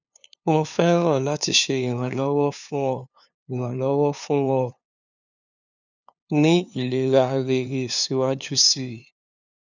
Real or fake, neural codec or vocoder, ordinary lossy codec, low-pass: fake; codec, 16 kHz, 2 kbps, FunCodec, trained on LibriTTS, 25 frames a second; none; 7.2 kHz